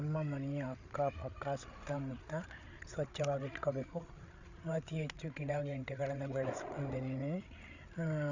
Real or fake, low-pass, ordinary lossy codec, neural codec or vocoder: fake; 7.2 kHz; Opus, 64 kbps; codec, 16 kHz, 8 kbps, FreqCodec, larger model